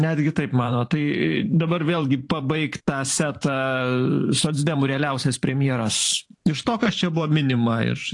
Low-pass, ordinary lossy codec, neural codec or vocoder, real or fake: 10.8 kHz; AAC, 48 kbps; vocoder, 44.1 kHz, 128 mel bands every 512 samples, BigVGAN v2; fake